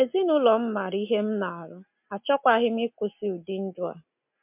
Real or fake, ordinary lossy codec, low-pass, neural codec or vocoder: real; MP3, 32 kbps; 3.6 kHz; none